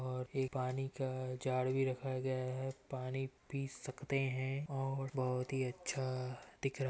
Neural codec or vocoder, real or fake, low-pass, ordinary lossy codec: none; real; none; none